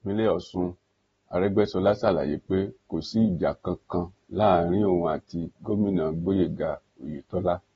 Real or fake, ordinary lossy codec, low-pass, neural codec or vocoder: real; AAC, 24 kbps; 19.8 kHz; none